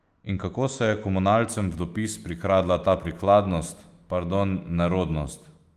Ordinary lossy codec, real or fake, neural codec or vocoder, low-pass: Opus, 24 kbps; fake; autoencoder, 48 kHz, 128 numbers a frame, DAC-VAE, trained on Japanese speech; 14.4 kHz